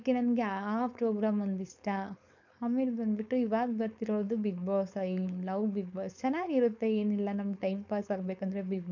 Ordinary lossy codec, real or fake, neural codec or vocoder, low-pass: none; fake; codec, 16 kHz, 4.8 kbps, FACodec; 7.2 kHz